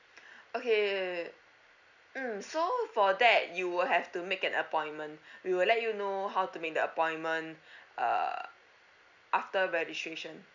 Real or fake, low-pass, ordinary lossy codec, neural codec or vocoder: real; 7.2 kHz; none; none